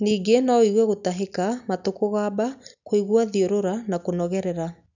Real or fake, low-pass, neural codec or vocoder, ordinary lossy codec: real; 7.2 kHz; none; none